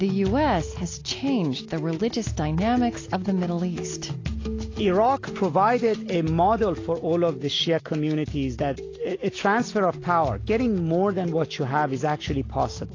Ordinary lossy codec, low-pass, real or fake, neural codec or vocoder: AAC, 32 kbps; 7.2 kHz; real; none